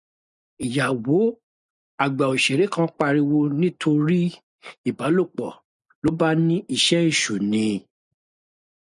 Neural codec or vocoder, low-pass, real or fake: none; 10.8 kHz; real